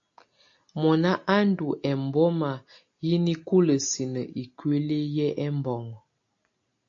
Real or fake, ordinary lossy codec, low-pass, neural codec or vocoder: real; AAC, 48 kbps; 7.2 kHz; none